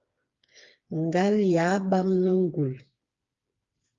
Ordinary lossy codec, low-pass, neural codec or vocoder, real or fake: Opus, 32 kbps; 7.2 kHz; codec, 16 kHz, 4 kbps, FreqCodec, smaller model; fake